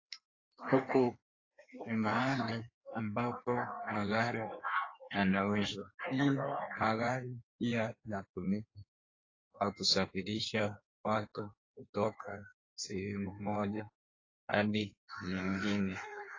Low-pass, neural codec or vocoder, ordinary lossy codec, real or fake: 7.2 kHz; codec, 16 kHz in and 24 kHz out, 1.1 kbps, FireRedTTS-2 codec; AAC, 32 kbps; fake